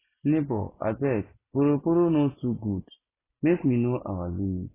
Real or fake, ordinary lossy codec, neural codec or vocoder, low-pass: real; AAC, 16 kbps; none; 3.6 kHz